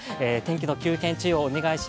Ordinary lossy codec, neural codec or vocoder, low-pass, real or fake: none; none; none; real